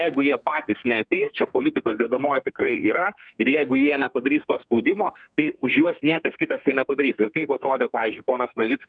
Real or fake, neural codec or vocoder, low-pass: fake; codec, 32 kHz, 1.9 kbps, SNAC; 9.9 kHz